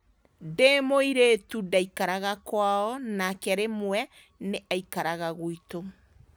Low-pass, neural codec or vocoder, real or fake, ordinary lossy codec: none; none; real; none